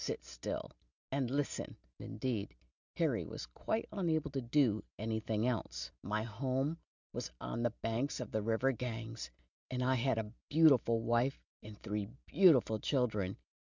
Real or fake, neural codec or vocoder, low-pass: real; none; 7.2 kHz